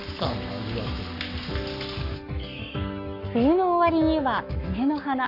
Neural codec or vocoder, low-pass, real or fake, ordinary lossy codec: codec, 44.1 kHz, 7.8 kbps, Pupu-Codec; 5.4 kHz; fake; none